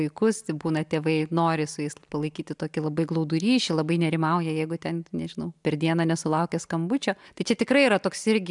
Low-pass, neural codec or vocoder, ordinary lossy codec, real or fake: 10.8 kHz; none; MP3, 96 kbps; real